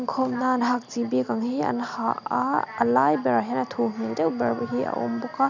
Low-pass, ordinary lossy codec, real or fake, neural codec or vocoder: 7.2 kHz; none; real; none